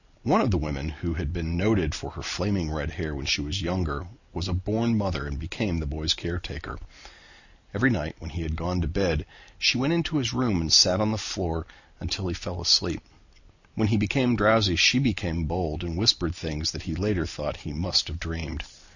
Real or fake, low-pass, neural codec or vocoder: real; 7.2 kHz; none